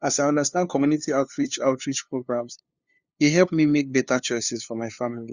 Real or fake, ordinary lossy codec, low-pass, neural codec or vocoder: fake; Opus, 64 kbps; 7.2 kHz; codec, 16 kHz, 2 kbps, FunCodec, trained on LibriTTS, 25 frames a second